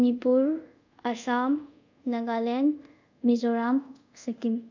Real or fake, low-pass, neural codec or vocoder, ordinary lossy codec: fake; 7.2 kHz; codec, 24 kHz, 0.5 kbps, DualCodec; none